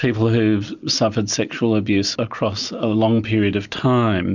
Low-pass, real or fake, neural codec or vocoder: 7.2 kHz; real; none